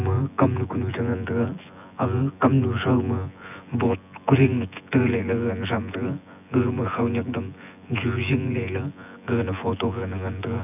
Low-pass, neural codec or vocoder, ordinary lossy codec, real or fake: 3.6 kHz; vocoder, 24 kHz, 100 mel bands, Vocos; none; fake